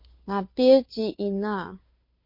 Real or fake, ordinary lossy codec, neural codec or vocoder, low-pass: fake; MP3, 32 kbps; codec, 16 kHz, 2 kbps, FunCodec, trained on Chinese and English, 25 frames a second; 5.4 kHz